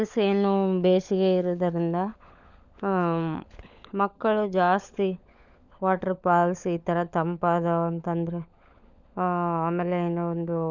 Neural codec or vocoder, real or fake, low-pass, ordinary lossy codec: codec, 16 kHz, 16 kbps, FunCodec, trained on LibriTTS, 50 frames a second; fake; 7.2 kHz; none